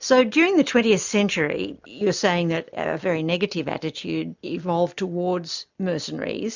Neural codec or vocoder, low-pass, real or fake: none; 7.2 kHz; real